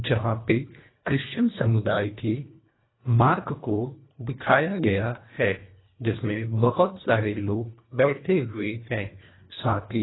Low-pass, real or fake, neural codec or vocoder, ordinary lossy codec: 7.2 kHz; fake; codec, 24 kHz, 1.5 kbps, HILCodec; AAC, 16 kbps